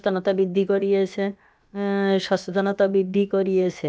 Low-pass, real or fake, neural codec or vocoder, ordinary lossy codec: none; fake; codec, 16 kHz, about 1 kbps, DyCAST, with the encoder's durations; none